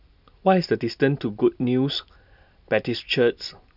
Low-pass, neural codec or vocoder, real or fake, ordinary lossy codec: 5.4 kHz; none; real; none